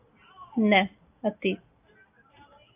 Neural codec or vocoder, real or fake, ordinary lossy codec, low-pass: none; real; AAC, 32 kbps; 3.6 kHz